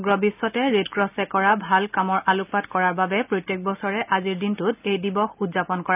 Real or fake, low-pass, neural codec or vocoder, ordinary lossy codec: real; 3.6 kHz; none; none